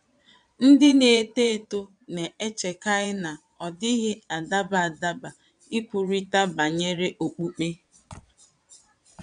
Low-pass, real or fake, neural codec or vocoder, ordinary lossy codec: 9.9 kHz; fake; vocoder, 22.05 kHz, 80 mel bands, Vocos; none